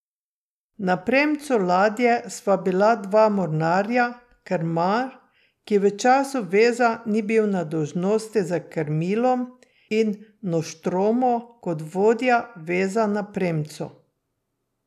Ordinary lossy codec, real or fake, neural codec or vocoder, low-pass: none; real; none; 14.4 kHz